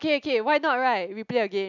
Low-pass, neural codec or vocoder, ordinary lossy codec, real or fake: 7.2 kHz; none; none; real